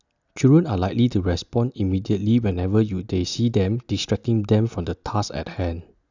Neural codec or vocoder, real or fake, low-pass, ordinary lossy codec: none; real; 7.2 kHz; none